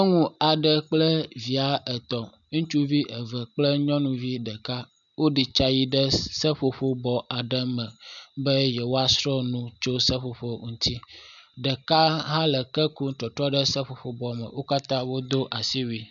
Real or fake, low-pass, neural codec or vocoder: real; 7.2 kHz; none